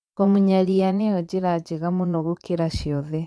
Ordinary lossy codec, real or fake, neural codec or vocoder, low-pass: none; fake; vocoder, 22.05 kHz, 80 mel bands, Vocos; none